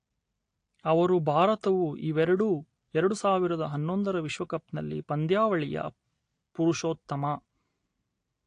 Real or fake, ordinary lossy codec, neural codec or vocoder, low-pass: real; AAC, 48 kbps; none; 9.9 kHz